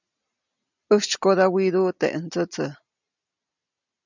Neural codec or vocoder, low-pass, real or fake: none; 7.2 kHz; real